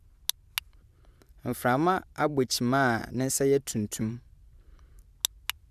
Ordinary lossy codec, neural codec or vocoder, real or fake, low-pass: none; vocoder, 44.1 kHz, 128 mel bands every 512 samples, BigVGAN v2; fake; 14.4 kHz